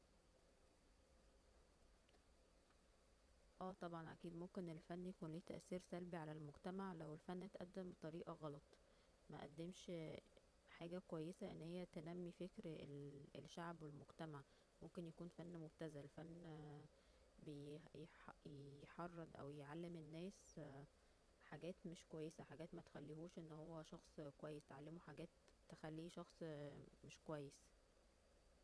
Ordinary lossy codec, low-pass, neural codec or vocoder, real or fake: none; none; vocoder, 22.05 kHz, 80 mel bands, Vocos; fake